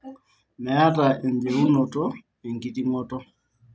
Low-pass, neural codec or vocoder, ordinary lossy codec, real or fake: none; none; none; real